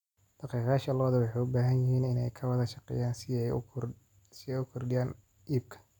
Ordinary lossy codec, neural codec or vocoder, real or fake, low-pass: none; none; real; 19.8 kHz